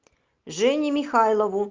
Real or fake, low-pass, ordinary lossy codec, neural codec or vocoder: real; 7.2 kHz; Opus, 24 kbps; none